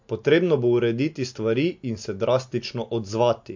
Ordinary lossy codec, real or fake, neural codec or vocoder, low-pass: MP3, 48 kbps; real; none; 7.2 kHz